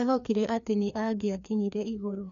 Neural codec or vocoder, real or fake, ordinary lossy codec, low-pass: codec, 16 kHz, 2 kbps, FreqCodec, larger model; fake; none; 7.2 kHz